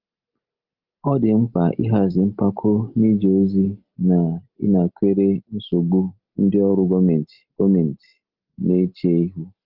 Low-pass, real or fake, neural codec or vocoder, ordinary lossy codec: 5.4 kHz; real; none; Opus, 32 kbps